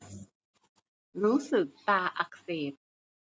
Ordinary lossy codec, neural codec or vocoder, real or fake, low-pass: none; none; real; none